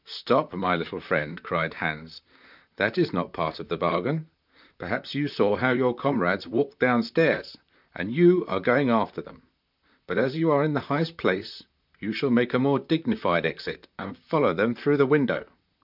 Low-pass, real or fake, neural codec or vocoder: 5.4 kHz; fake; vocoder, 44.1 kHz, 128 mel bands, Pupu-Vocoder